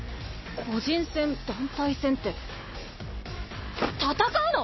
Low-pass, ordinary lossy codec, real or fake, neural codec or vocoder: 7.2 kHz; MP3, 24 kbps; real; none